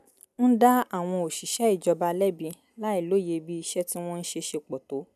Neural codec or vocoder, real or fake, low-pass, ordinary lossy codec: none; real; 14.4 kHz; none